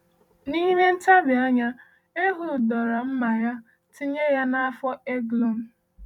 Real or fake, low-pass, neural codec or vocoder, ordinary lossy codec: fake; 19.8 kHz; vocoder, 44.1 kHz, 128 mel bands every 256 samples, BigVGAN v2; none